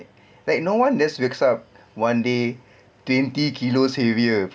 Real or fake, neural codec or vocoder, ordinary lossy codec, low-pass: real; none; none; none